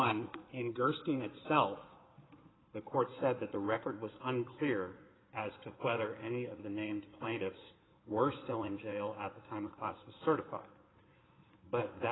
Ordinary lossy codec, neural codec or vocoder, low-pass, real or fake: AAC, 16 kbps; codec, 16 kHz in and 24 kHz out, 2.2 kbps, FireRedTTS-2 codec; 7.2 kHz; fake